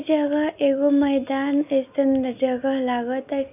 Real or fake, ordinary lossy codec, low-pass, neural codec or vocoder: real; none; 3.6 kHz; none